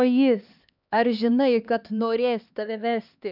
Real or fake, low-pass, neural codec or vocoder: fake; 5.4 kHz; codec, 16 kHz, 2 kbps, X-Codec, HuBERT features, trained on LibriSpeech